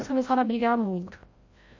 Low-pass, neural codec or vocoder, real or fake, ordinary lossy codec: 7.2 kHz; codec, 16 kHz, 0.5 kbps, FreqCodec, larger model; fake; MP3, 32 kbps